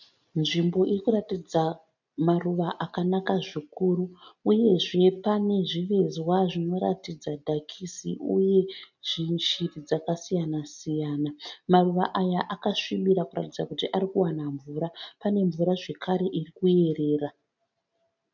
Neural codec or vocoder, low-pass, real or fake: none; 7.2 kHz; real